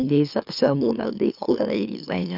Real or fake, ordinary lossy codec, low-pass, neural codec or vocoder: fake; none; 5.4 kHz; autoencoder, 44.1 kHz, a latent of 192 numbers a frame, MeloTTS